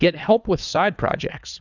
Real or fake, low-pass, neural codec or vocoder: fake; 7.2 kHz; codec, 24 kHz, 3 kbps, HILCodec